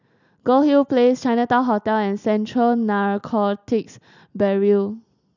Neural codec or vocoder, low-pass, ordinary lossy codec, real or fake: none; 7.2 kHz; none; real